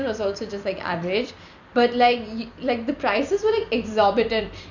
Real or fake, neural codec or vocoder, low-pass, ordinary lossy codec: fake; vocoder, 44.1 kHz, 128 mel bands every 256 samples, BigVGAN v2; 7.2 kHz; none